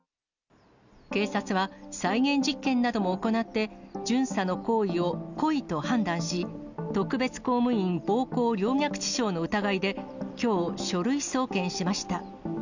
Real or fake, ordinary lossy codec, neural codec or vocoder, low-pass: real; none; none; 7.2 kHz